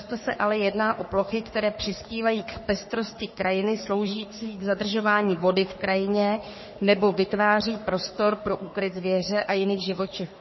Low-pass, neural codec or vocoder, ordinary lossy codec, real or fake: 7.2 kHz; codec, 44.1 kHz, 3.4 kbps, Pupu-Codec; MP3, 24 kbps; fake